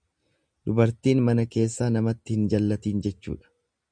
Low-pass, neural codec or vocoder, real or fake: 9.9 kHz; none; real